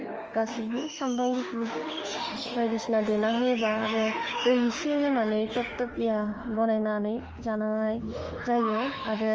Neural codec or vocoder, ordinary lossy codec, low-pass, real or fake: autoencoder, 48 kHz, 32 numbers a frame, DAC-VAE, trained on Japanese speech; Opus, 24 kbps; 7.2 kHz; fake